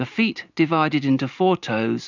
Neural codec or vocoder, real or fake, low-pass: vocoder, 44.1 kHz, 128 mel bands every 512 samples, BigVGAN v2; fake; 7.2 kHz